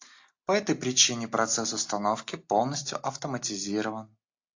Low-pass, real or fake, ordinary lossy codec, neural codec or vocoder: 7.2 kHz; real; AAC, 48 kbps; none